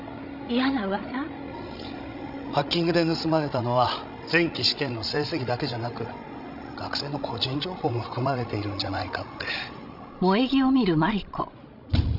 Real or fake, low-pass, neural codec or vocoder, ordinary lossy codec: fake; 5.4 kHz; codec, 16 kHz, 16 kbps, FreqCodec, larger model; none